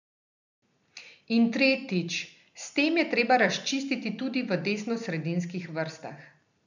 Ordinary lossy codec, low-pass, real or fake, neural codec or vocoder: none; 7.2 kHz; real; none